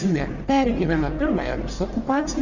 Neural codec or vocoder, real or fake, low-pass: codec, 44.1 kHz, 1.7 kbps, Pupu-Codec; fake; 7.2 kHz